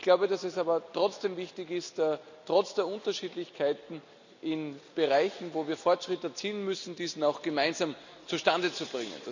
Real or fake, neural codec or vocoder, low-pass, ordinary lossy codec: real; none; 7.2 kHz; none